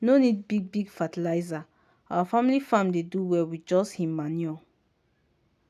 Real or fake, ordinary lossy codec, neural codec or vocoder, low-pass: real; none; none; 14.4 kHz